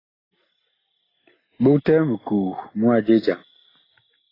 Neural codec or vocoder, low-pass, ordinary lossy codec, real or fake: none; 5.4 kHz; AAC, 24 kbps; real